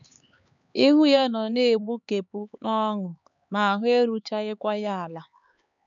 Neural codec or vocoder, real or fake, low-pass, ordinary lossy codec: codec, 16 kHz, 4 kbps, X-Codec, HuBERT features, trained on LibriSpeech; fake; 7.2 kHz; none